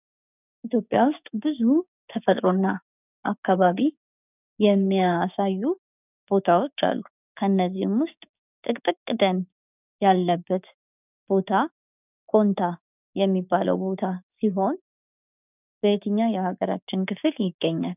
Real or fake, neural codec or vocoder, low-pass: fake; codec, 16 kHz, 6 kbps, DAC; 3.6 kHz